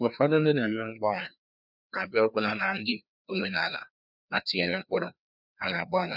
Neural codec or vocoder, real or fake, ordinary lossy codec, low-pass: codec, 16 kHz, 2 kbps, FreqCodec, larger model; fake; none; 5.4 kHz